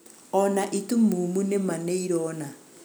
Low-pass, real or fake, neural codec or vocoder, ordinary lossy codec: none; real; none; none